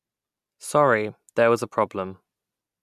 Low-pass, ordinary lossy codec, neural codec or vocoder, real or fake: 14.4 kHz; none; none; real